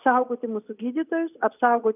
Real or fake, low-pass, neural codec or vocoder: real; 3.6 kHz; none